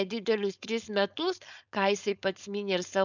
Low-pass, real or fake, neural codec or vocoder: 7.2 kHz; fake; codec, 16 kHz, 4.8 kbps, FACodec